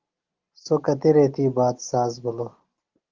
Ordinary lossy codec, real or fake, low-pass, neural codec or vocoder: Opus, 16 kbps; real; 7.2 kHz; none